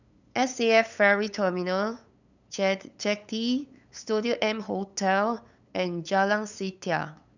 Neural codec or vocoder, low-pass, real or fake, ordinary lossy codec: codec, 16 kHz, 8 kbps, FunCodec, trained on LibriTTS, 25 frames a second; 7.2 kHz; fake; none